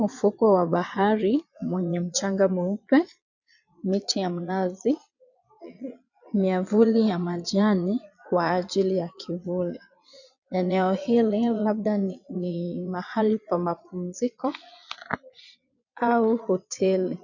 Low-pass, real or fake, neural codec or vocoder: 7.2 kHz; fake; vocoder, 22.05 kHz, 80 mel bands, Vocos